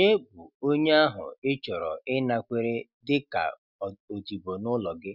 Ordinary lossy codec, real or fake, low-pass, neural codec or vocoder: none; real; 5.4 kHz; none